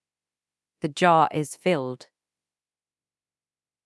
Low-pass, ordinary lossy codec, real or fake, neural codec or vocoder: none; none; fake; codec, 24 kHz, 0.9 kbps, DualCodec